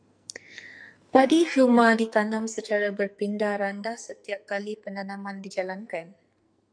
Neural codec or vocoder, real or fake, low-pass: codec, 44.1 kHz, 2.6 kbps, SNAC; fake; 9.9 kHz